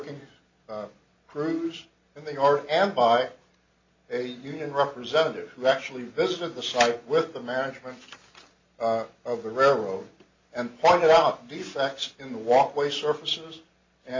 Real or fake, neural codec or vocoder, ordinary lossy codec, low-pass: real; none; MP3, 48 kbps; 7.2 kHz